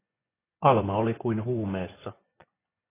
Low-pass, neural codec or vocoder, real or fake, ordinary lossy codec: 3.6 kHz; vocoder, 24 kHz, 100 mel bands, Vocos; fake; AAC, 16 kbps